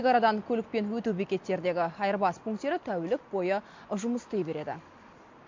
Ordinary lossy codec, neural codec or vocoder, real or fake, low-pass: MP3, 48 kbps; none; real; 7.2 kHz